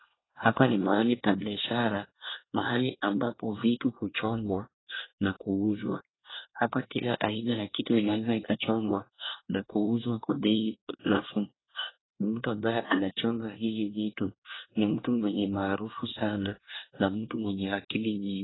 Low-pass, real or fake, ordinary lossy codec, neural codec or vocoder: 7.2 kHz; fake; AAC, 16 kbps; codec, 24 kHz, 1 kbps, SNAC